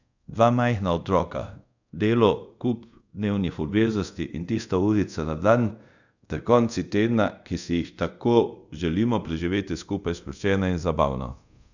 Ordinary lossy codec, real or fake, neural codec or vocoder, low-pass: none; fake; codec, 24 kHz, 0.5 kbps, DualCodec; 7.2 kHz